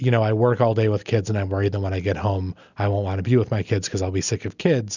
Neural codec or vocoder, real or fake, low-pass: none; real; 7.2 kHz